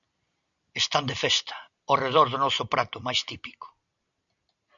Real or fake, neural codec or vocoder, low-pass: real; none; 7.2 kHz